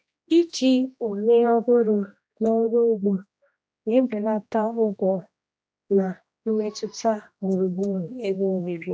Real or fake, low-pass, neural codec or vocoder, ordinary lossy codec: fake; none; codec, 16 kHz, 1 kbps, X-Codec, HuBERT features, trained on general audio; none